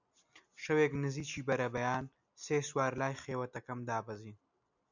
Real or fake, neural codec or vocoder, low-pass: real; none; 7.2 kHz